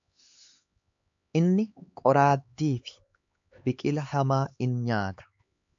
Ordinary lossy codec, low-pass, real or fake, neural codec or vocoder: AAC, 64 kbps; 7.2 kHz; fake; codec, 16 kHz, 4 kbps, X-Codec, HuBERT features, trained on LibriSpeech